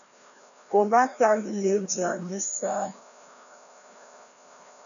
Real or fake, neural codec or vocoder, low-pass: fake; codec, 16 kHz, 1 kbps, FreqCodec, larger model; 7.2 kHz